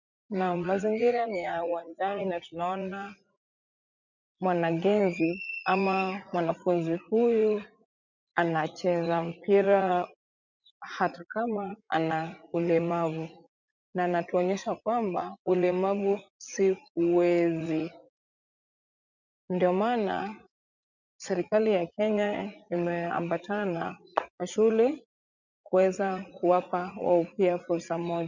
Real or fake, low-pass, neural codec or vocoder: fake; 7.2 kHz; vocoder, 44.1 kHz, 80 mel bands, Vocos